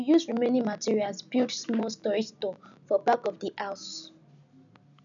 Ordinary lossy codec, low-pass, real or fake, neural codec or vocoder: none; 7.2 kHz; real; none